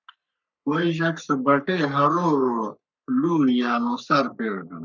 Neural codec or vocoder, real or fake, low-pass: codec, 44.1 kHz, 3.4 kbps, Pupu-Codec; fake; 7.2 kHz